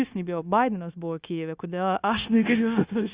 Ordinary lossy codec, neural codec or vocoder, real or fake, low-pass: Opus, 64 kbps; codec, 16 kHz, 0.9 kbps, LongCat-Audio-Codec; fake; 3.6 kHz